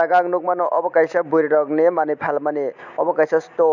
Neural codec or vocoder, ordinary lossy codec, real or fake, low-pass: none; none; real; 7.2 kHz